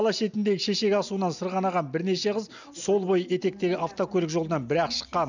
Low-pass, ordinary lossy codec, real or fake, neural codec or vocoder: 7.2 kHz; none; real; none